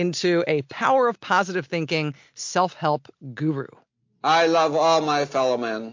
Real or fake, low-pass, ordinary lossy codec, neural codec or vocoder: real; 7.2 kHz; MP3, 48 kbps; none